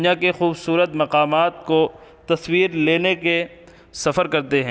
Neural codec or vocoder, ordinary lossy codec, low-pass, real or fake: none; none; none; real